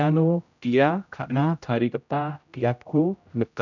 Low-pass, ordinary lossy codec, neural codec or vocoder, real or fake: 7.2 kHz; none; codec, 16 kHz, 0.5 kbps, X-Codec, HuBERT features, trained on general audio; fake